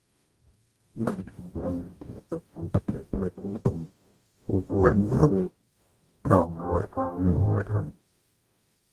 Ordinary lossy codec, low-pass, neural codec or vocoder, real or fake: Opus, 32 kbps; 14.4 kHz; codec, 44.1 kHz, 0.9 kbps, DAC; fake